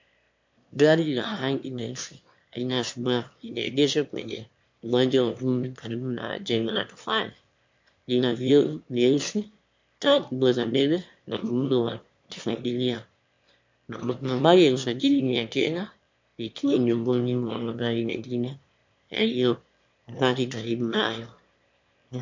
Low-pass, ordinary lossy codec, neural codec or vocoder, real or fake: 7.2 kHz; MP3, 48 kbps; autoencoder, 22.05 kHz, a latent of 192 numbers a frame, VITS, trained on one speaker; fake